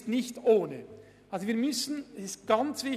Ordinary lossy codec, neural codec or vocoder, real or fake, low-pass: none; none; real; 14.4 kHz